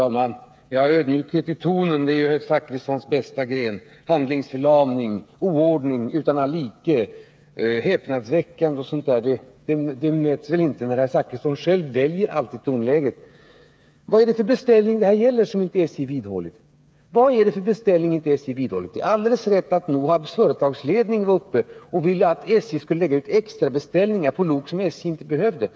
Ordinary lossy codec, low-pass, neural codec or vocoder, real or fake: none; none; codec, 16 kHz, 8 kbps, FreqCodec, smaller model; fake